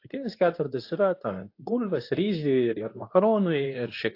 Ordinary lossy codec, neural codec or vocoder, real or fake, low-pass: AAC, 32 kbps; codec, 24 kHz, 0.9 kbps, WavTokenizer, medium speech release version 2; fake; 5.4 kHz